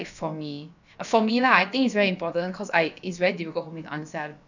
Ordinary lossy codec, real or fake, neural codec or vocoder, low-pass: none; fake; codec, 16 kHz, about 1 kbps, DyCAST, with the encoder's durations; 7.2 kHz